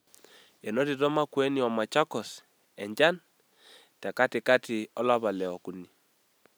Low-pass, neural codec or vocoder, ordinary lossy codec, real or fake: none; none; none; real